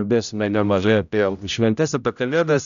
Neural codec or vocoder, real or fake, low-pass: codec, 16 kHz, 0.5 kbps, X-Codec, HuBERT features, trained on general audio; fake; 7.2 kHz